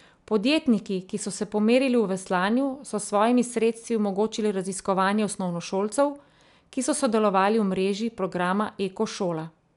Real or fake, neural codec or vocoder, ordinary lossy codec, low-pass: real; none; MP3, 96 kbps; 10.8 kHz